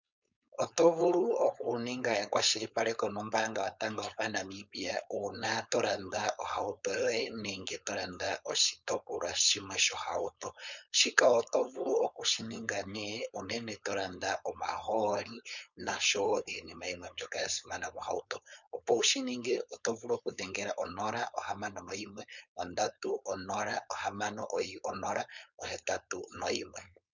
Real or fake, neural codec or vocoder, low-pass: fake; codec, 16 kHz, 4.8 kbps, FACodec; 7.2 kHz